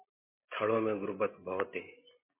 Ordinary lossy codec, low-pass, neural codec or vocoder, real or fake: MP3, 24 kbps; 3.6 kHz; none; real